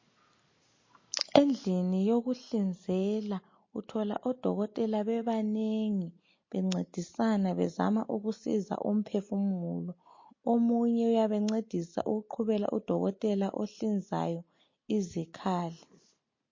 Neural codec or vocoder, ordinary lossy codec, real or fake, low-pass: none; MP3, 32 kbps; real; 7.2 kHz